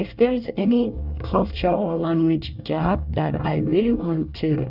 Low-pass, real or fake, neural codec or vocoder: 5.4 kHz; fake; codec, 24 kHz, 1 kbps, SNAC